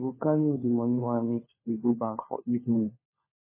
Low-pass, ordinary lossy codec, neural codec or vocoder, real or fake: 3.6 kHz; MP3, 16 kbps; codec, 16 kHz, 4 kbps, FunCodec, trained on LibriTTS, 50 frames a second; fake